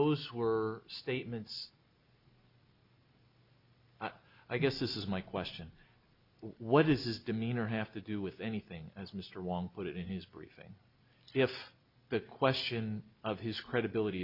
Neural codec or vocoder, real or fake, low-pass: none; real; 5.4 kHz